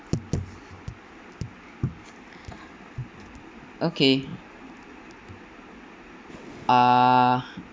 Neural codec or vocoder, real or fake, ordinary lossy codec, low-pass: none; real; none; none